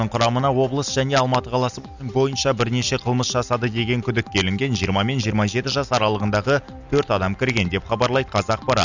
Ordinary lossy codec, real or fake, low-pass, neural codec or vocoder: none; real; 7.2 kHz; none